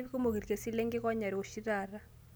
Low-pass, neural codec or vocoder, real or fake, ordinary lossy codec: none; none; real; none